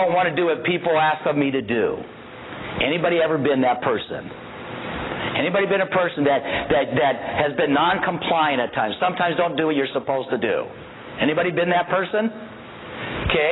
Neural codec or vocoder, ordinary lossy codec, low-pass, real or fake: none; AAC, 16 kbps; 7.2 kHz; real